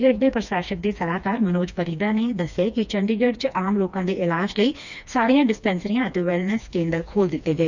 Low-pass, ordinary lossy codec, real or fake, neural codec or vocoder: 7.2 kHz; none; fake; codec, 16 kHz, 2 kbps, FreqCodec, smaller model